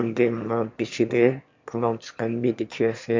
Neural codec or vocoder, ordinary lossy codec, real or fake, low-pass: autoencoder, 22.05 kHz, a latent of 192 numbers a frame, VITS, trained on one speaker; MP3, 64 kbps; fake; 7.2 kHz